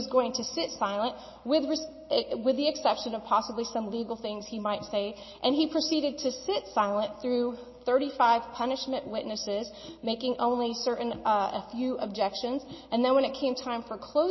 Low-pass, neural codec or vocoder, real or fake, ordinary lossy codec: 7.2 kHz; none; real; MP3, 24 kbps